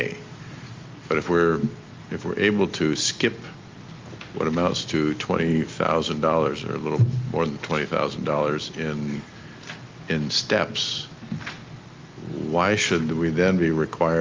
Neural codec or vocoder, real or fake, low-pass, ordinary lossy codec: none; real; 7.2 kHz; Opus, 32 kbps